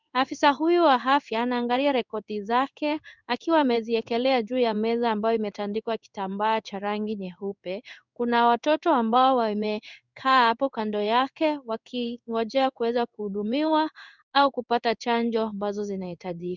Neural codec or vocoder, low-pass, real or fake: codec, 16 kHz in and 24 kHz out, 1 kbps, XY-Tokenizer; 7.2 kHz; fake